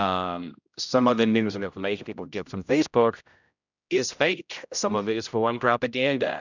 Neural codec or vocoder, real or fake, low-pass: codec, 16 kHz, 0.5 kbps, X-Codec, HuBERT features, trained on general audio; fake; 7.2 kHz